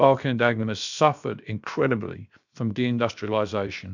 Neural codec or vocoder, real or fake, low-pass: codec, 16 kHz, 0.7 kbps, FocalCodec; fake; 7.2 kHz